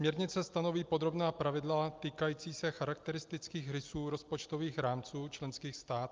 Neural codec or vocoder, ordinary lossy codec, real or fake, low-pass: none; Opus, 24 kbps; real; 7.2 kHz